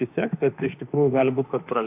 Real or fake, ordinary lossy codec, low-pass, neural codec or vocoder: fake; AAC, 32 kbps; 3.6 kHz; autoencoder, 48 kHz, 32 numbers a frame, DAC-VAE, trained on Japanese speech